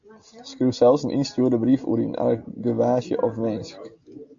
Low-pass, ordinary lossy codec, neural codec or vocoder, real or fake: 7.2 kHz; AAC, 64 kbps; none; real